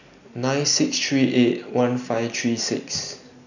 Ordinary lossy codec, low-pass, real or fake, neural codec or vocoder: none; 7.2 kHz; real; none